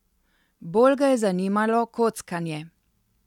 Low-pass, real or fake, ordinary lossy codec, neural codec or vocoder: 19.8 kHz; real; none; none